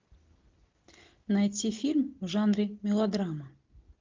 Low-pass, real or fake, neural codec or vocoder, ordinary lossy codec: 7.2 kHz; real; none; Opus, 32 kbps